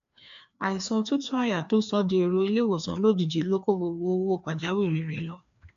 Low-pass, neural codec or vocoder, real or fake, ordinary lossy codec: 7.2 kHz; codec, 16 kHz, 2 kbps, FreqCodec, larger model; fake; AAC, 64 kbps